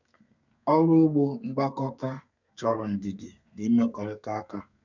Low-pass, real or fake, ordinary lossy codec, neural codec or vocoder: 7.2 kHz; fake; none; codec, 32 kHz, 1.9 kbps, SNAC